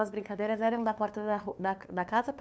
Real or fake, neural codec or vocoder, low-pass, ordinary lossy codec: fake; codec, 16 kHz, 2 kbps, FunCodec, trained on LibriTTS, 25 frames a second; none; none